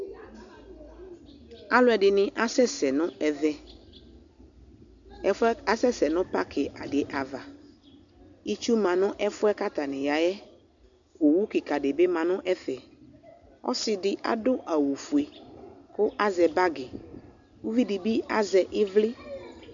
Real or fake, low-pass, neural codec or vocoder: real; 7.2 kHz; none